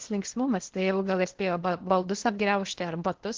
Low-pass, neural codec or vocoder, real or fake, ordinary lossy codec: 7.2 kHz; codec, 16 kHz in and 24 kHz out, 0.8 kbps, FocalCodec, streaming, 65536 codes; fake; Opus, 16 kbps